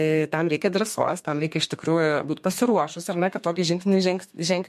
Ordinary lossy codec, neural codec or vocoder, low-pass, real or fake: MP3, 64 kbps; codec, 32 kHz, 1.9 kbps, SNAC; 14.4 kHz; fake